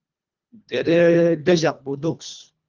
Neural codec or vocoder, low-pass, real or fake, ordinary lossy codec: codec, 24 kHz, 1.5 kbps, HILCodec; 7.2 kHz; fake; Opus, 24 kbps